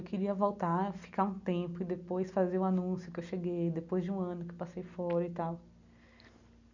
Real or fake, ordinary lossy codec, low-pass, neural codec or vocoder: real; none; 7.2 kHz; none